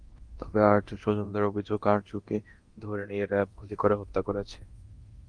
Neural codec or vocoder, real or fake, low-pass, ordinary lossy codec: codec, 24 kHz, 0.9 kbps, DualCodec; fake; 9.9 kHz; Opus, 24 kbps